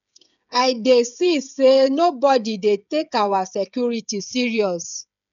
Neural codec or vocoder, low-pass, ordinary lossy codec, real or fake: codec, 16 kHz, 8 kbps, FreqCodec, smaller model; 7.2 kHz; none; fake